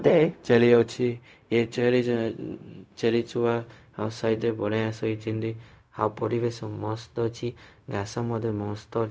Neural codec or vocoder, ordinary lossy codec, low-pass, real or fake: codec, 16 kHz, 0.4 kbps, LongCat-Audio-Codec; none; none; fake